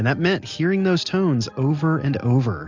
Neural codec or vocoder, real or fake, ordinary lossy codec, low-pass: none; real; MP3, 64 kbps; 7.2 kHz